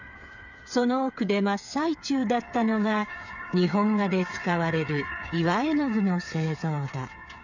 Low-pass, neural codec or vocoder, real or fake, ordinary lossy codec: 7.2 kHz; codec, 16 kHz, 8 kbps, FreqCodec, smaller model; fake; none